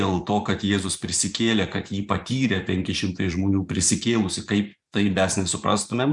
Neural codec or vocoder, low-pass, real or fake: vocoder, 24 kHz, 100 mel bands, Vocos; 10.8 kHz; fake